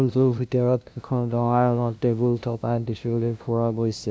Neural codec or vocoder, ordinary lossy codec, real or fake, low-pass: codec, 16 kHz, 0.5 kbps, FunCodec, trained on LibriTTS, 25 frames a second; none; fake; none